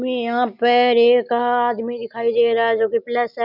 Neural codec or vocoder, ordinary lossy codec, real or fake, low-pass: none; none; real; 5.4 kHz